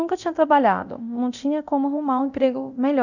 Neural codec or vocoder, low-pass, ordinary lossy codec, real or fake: codec, 24 kHz, 0.9 kbps, DualCodec; 7.2 kHz; none; fake